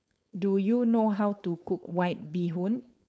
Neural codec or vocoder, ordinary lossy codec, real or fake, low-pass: codec, 16 kHz, 4.8 kbps, FACodec; none; fake; none